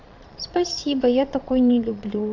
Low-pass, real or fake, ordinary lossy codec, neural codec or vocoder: 7.2 kHz; fake; AAC, 48 kbps; vocoder, 22.05 kHz, 80 mel bands, Vocos